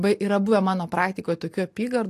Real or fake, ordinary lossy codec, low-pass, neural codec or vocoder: real; AAC, 64 kbps; 14.4 kHz; none